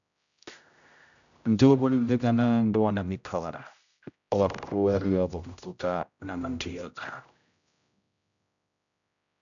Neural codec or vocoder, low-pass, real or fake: codec, 16 kHz, 0.5 kbps, X-Codec, HuBERT features, trained on general audio; 7.2 kHz; fake